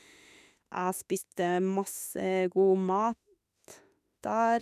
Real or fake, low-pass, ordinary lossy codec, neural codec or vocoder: fake; 14.4 kHz; none; autoencoder, 48 kHz, 32 numbers a frame, DAC-VAE, trained on Japanese speech